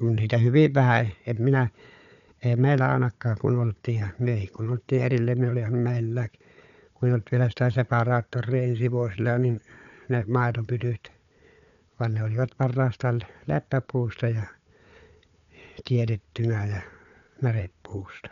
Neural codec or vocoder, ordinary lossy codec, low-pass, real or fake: codec, 16 kHz, 4 kbps, FunCodec, trained on Chinese and English, 50 frames a second; none; 7.2 kHz; fake